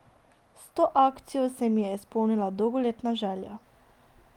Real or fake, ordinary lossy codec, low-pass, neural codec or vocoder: real; Opus, 32 kbps; 19.8 kHz; none